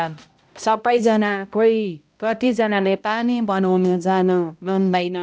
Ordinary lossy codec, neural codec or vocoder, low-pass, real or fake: none; codec, 16 kHz, 0.5 kbps, X-Codec, HuBERT features, trained on balanced general audio; none; fake